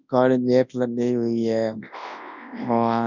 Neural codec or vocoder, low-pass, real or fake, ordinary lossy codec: codec, 24 kHz, 0.9 kbps, WavTokenizer, large speech release; 7.2 kHz; fake; none